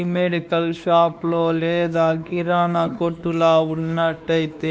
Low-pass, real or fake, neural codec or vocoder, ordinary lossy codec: none; fake; codec, 16 kHz, 2 kbps, X-Codec, WavLM features, trained on Multilingual LibriSpeech; none